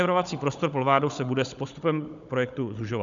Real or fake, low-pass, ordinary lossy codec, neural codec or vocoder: fake; 7.2 kHz; Opus, 64 kbps; codec, 16 kHz, 16 kbps, FunCodec, trained on Chinese and English, 50 frames a second